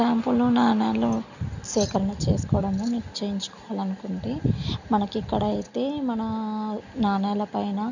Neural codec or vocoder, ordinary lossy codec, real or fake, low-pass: none; none; real; 7.2 kHz